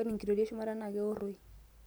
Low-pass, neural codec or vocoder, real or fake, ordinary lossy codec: none; none; real; none